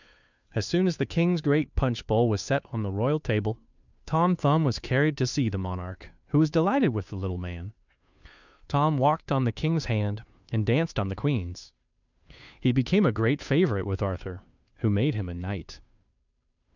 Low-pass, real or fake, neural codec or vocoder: 7.2 kHz; fake; codec, 16 kHz, 2 kbps, FunCodec, trained on Chinese and English, 25 frames a second